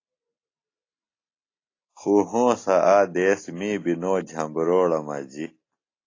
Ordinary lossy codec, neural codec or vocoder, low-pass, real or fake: AAC, 32 kbps; none; 7.2 kHz; real